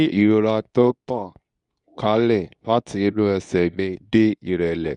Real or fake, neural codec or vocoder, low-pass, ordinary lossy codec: fake; codec, 24 kHz, 0.9 kbps, WavTokenizer, medium speech release version 2; 10.8 kHz; none